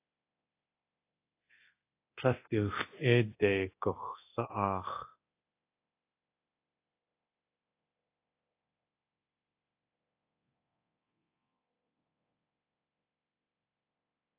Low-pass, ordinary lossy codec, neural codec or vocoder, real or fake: 3.6 kHz; AAC, 24 kbps; codec, 24 kHz, 0.9 kbps, DualCodec; fake